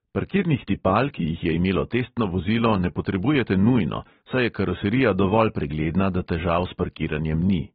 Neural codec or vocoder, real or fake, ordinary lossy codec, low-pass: none; real; AAC, 16 kbps; 19.8 kHz